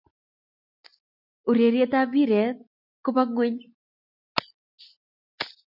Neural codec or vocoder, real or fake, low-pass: none; real; 5.4 kHz